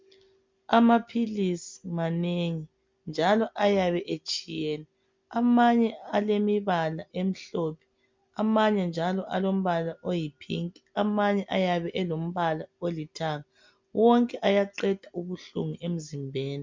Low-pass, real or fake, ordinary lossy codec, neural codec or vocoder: 7.2 kHz; real; MP3, 64 kbps; none